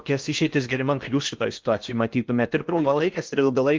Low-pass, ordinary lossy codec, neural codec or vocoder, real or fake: 7.2 kHz; Opus, 32 kbps; codec, 16 kHz in and 24 kHz out, 0.8 kbps, FocalCodec, streaming, 65536 codes; fake